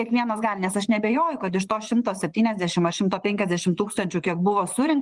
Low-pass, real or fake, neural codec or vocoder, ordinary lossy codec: 10.8 kHz; fake; vocoder, 24 kHz, 100 mel bands, Vocos; Opus, 24 kbps